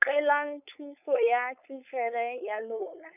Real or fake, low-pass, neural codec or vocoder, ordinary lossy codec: fake; 3.6 kHz; codec, 16 kHz, 4.8 kbps, FACodec; none